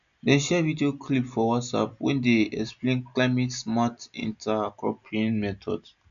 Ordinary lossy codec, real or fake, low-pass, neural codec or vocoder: none; real; 7.2 kHz; none